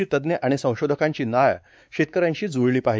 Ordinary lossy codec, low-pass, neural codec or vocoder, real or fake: none; none; codec, 16 kHz, 4 kbps, X-Codec, WavLM features, trained on Multilingual LibriSpeech; fake